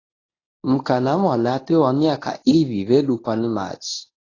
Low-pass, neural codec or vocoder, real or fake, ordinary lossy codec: 7.2 kHz; codec, 24 kHz, 0.9 kbps, WavTokenizer, medium speech release version 1; fake; AAC, 32 kbps